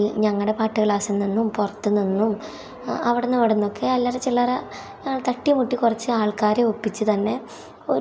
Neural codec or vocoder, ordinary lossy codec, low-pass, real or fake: none; none; none; real